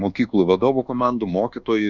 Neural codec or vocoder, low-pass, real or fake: codec, 24 kHz, 1.2 kbps, DualCodec; 7.2 kHz; fake